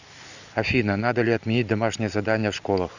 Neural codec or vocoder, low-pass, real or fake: none; 7.2 kHz; real